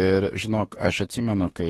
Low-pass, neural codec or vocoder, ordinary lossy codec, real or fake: 19.8 kHz; autoencoder, 48 kHz, 32 numbers a frame, DAC-VAE, trained on Japanese speech; AAC, 32 kbps; fake